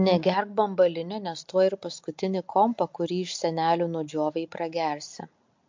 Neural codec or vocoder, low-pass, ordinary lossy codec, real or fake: none; 7.2 kHz; MP3, 48 kbps; real